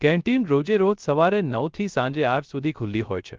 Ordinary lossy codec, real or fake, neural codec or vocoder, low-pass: Opus, 32 kbps; fake; codec, 16 kHz, 0.3 kbps, FocalCodec; 7.2 kHz